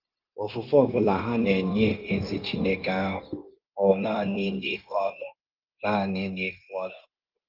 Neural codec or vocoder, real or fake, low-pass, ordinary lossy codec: codec, 16 kHz, 0.9 kbps, LongCat-Audio-Codec; fake; 5.4 kHz; Opus, 32 kbps